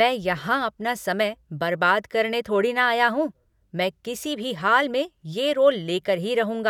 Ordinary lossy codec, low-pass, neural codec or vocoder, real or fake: none; 19.8 kHz; none; real